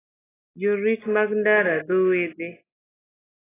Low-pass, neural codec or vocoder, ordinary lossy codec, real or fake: 3.6 kHz; none; AAC, 16 kbps; real